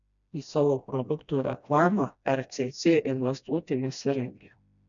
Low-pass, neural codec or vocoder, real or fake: 7.2 kHz; codec, 16 kHz, 1 kbps, FreqCodec, smaller model; fake